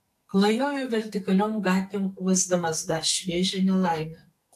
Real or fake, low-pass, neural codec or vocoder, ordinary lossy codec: fake; 14.4 kHz; codec, 44.1 kHz, 2.6 kbps, SNAC; AAC, 64 kbps